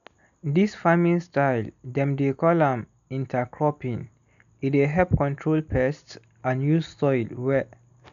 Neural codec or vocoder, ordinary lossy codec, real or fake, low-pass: none; none; real; 7.2 kHz